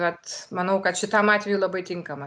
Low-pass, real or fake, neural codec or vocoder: 9.9 kHz; real; none